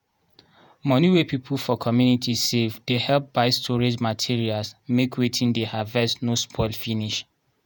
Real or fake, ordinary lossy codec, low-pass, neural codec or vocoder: fake; none; none; vocoder, 48 kHz, 128 mel bands, Vocos